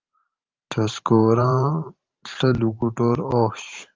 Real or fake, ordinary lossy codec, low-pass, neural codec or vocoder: fake; Opus, 32 kbps; 7.2 kHz; vocoder, 44.1 kHz, 128 mel bands every 512 samples, BigVGAN v2